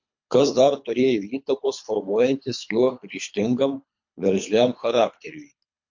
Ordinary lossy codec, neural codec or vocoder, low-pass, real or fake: MP3, 48 kbps; codec, 24 kHz, 3 kbps, HILCodec; 7.2 kHz; fake